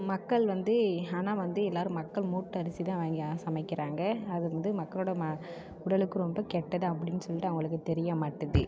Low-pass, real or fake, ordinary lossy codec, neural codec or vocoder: none; real; none; none